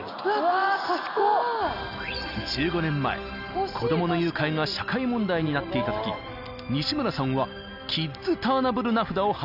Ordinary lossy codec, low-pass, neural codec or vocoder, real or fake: none; 5.4 kHz; none; real